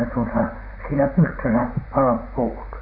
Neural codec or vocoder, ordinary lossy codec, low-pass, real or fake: vocoder, 44.1 kHz, 128 mel bands, Pupu-Vocoder; none; 5.4 kHz; fake